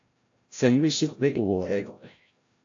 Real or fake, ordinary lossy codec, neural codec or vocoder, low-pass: fake; AAC, 48 kbps; codec, 16 kHz, 0.5 kbps, FreqCodec, larger model; 7.2 kHz